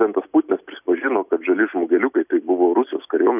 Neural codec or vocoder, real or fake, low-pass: none; real; 3.6 kHz